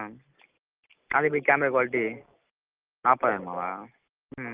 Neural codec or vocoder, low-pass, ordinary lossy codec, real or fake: none; 3.6 kHz; Opus, 32 kbps; real